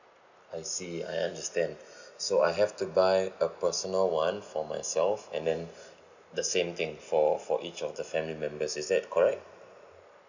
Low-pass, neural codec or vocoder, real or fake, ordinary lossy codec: 7.2 kHz; none; real; none